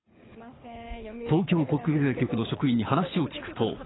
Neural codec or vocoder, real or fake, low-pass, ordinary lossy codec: codec, 24 kHz, 6 kbps, HILCodec; fake; 7.2 kHz; AAC, 16 kbps